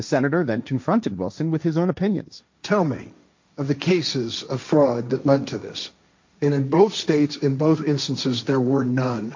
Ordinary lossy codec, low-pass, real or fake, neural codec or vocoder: MP3, 48 kbps; 7.2 kHz; fake; codec, 16 kHz, 1.1 kbps, Voila-Tokenizer